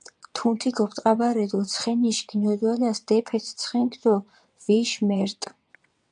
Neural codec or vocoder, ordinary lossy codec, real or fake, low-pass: vocoder, 22.05 kHz, 80 mel bands, WaveNeXt; MP3, 96 kbps; fake; 9.9 kHz